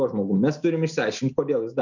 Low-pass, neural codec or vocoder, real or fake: 7.2 kHz; none; real